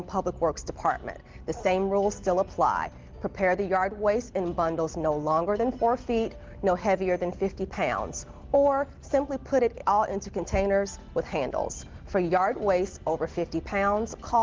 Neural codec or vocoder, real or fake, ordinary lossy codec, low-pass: none; real; Opus, 16 kbps; 7.2 kHz